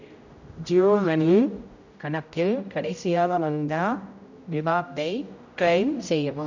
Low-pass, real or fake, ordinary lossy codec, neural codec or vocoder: 7.2 kHz; fake; none; codec, 16 kHz, 0.5 kbps, X-Codec, HuBERT features, trained on general audio